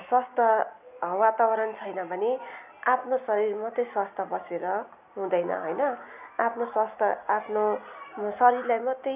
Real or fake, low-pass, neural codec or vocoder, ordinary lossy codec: real; 3.6 kHz; none; none